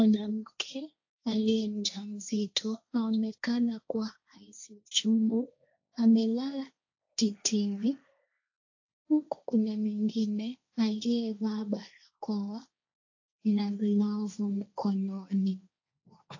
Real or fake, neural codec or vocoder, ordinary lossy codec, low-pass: fake; codec, 16 kHz, 1.1 kbps, Voila-Tokenizer; AAC, 48 kbps; 7.2 kHz